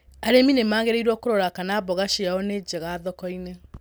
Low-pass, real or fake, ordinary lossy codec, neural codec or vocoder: none; real; none; none